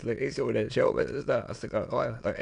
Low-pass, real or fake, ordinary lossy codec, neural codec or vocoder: 9.9 kHz; fake; MP3, 64 kbps; autoencoder, 22.05 kHz, a latent of 192 numbers a frame, VITS, trained on many speakers